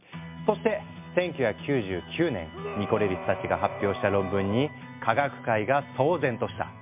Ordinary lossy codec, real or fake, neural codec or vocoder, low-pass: MP3, 32 kbps; real; none; 3.6 kHz